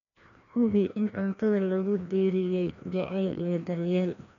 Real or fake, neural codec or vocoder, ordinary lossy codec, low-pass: fake; codec, 16 kHz, 1 kbps, FreqCodec, larger model; none; 7.2 kHz